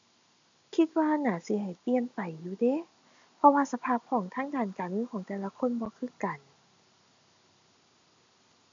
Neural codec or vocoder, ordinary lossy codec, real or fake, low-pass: none; MP3, 64 kbps; real; 7.2 kHz